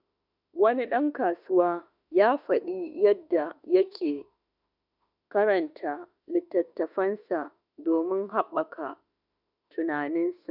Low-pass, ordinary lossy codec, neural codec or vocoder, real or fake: 5.4 kHz; none; autoencoder, 48 kHz, 32 numbers a frame, DAC-VAE, trained on Japanese speech; fake